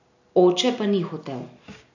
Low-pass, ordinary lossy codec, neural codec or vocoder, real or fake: 7.2 kHz; none; none; real